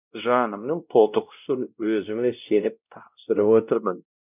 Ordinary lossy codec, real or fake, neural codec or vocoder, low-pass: none; fake; codec, 16 kHz, 0.5 kbps, X-Codec, WavLM features, trained on Multilingual LibriSpeech; 3.6 kHz